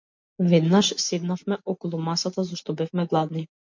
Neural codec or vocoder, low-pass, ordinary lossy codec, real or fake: none; 7.2 kHz; MP3, 48 kbps; real